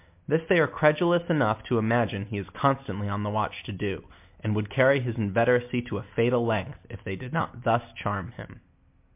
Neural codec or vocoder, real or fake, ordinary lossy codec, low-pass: none; real; MP3, 32 kbps; 3.6 kHz